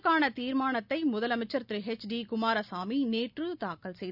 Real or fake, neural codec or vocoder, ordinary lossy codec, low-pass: real; none; none; 5.4 kHz